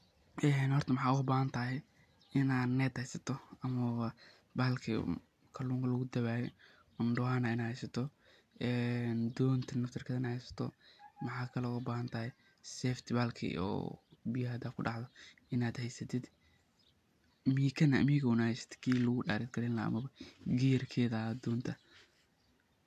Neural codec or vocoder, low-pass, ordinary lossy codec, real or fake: none; 14.4 kHz; AAC, 96 kbps; real